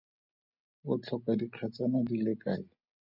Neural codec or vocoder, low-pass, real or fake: none; 5.4 kHz; real